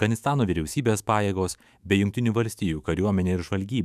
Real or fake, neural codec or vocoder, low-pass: fake; autoencoder, 48 kHz, 128 numbers a frame, DAC-VAE, trained on Japanese speech; 14.4 kHz